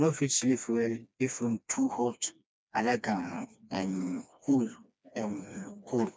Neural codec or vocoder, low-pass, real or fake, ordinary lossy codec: codec, 16 kHz, 2 kbps, FreqCodec, smaller model; none; fake; none